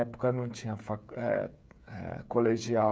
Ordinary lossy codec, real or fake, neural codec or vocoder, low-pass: none; fake; codec, 16 kHz, 8 kbps, FreqCodec, smaller model; none